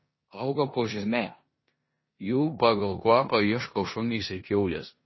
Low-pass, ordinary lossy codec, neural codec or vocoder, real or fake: 7.2 kHz; MP3, 24 kbps; codec, 16 kHz in and 24 kHz out, 0.9 kbps, LongCat-Audio-Codec, four codebook decoder; fake